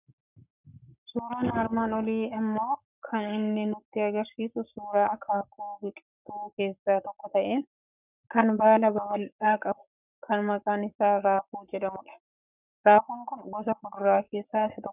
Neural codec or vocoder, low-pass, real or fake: codec, 44.1 kHz, 7.8 kbps, Pupu-Codec; 3.6 kHz; fake